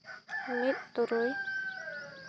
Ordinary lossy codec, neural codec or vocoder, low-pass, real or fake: none; none; none; real